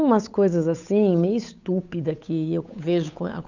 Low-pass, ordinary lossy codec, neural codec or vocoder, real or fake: 7.2 kHz; none; codec, 16 kHz, 8 kbps, FunCodec, trained on Chinese and English, 25 frames a second; fake